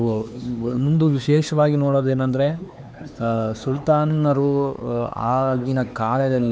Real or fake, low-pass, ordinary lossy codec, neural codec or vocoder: fake; none; none; codec, 16 kHz, 4 kbps, X-Codec, HuBERT features, trained on LibriSpeech